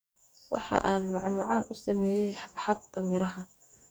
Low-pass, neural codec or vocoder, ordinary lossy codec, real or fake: none; codec, 44.1 kHz, 2.6 kbps, DAC; none; fake